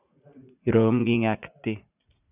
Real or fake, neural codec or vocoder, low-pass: fake; vocoder, 44.1 kHz, 80 mel bands, Vocos; 3.6 kHz